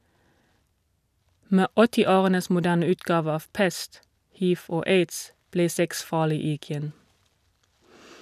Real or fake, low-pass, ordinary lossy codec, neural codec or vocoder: real; 14.4 kHz; none; none